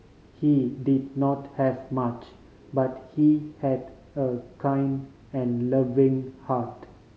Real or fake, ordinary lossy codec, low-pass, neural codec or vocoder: real; none; none; none